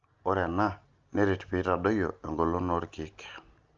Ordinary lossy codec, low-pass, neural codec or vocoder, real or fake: Opus, 24 kbps; 7.2 kHz; none; real